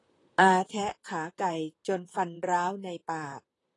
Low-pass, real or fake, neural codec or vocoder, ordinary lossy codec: 10.8 kHz; fake; vocoder, 44.1 kHz, 128 mel bands, Pupu-Vocoder; AAC, 32 kbps